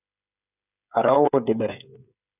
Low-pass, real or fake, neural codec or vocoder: 3.6 kHz; fake; codec, 16 kHz, 8 kbps, FreqCodec, smaller model